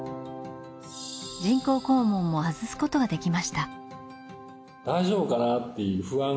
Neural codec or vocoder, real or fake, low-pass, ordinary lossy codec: none; real; none; none